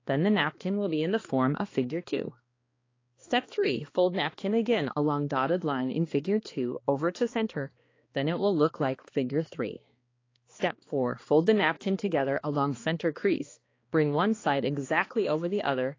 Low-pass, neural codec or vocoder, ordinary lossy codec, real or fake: 7.2 kHz; codec, 16 kHz, 2 kbps, X-Codec, HuBERT features, trained on balanced general audio; AAC, 32 kbps; fake